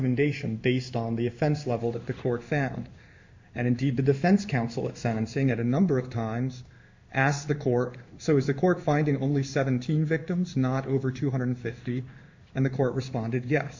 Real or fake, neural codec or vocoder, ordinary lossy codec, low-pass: fake; codec, 16 kHz in and 24 kHz out, 1 kbps, XY-Tokenizer; MP3, 64 kbps; 7.2 kHz